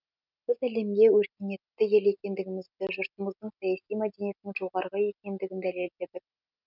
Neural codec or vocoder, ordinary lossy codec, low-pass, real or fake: none; none; 5.4 kHz; real